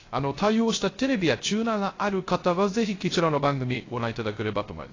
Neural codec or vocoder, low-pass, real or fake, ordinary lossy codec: codec, 16 kHz, 0.3 kbps, FocalCodec; 7.2 kHz; fake; AAC, 32 kbps